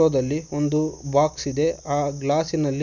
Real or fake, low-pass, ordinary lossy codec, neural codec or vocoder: real; 7.2 kHz; none; none